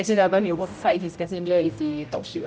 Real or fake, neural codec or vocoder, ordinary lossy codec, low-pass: fake; codec, 16 kHz, 0.5 kbps, X-Codec, HuBERT features, trained on general audio; none; none